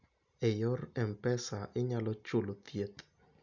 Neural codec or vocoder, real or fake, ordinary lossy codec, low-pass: none; real; none; 7.2 kHz